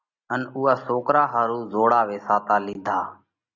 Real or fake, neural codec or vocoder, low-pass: real; none; 7.2 kHz